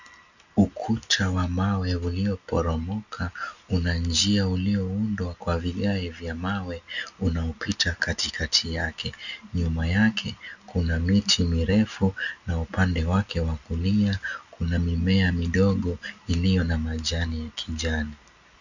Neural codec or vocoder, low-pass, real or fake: none; 7.2 kHz; real